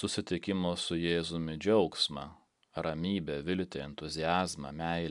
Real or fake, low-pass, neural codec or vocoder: real; 10.8 kHz; none